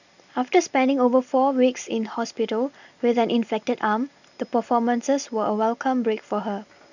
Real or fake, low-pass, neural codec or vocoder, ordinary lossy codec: real; 7.2 kHz; none; none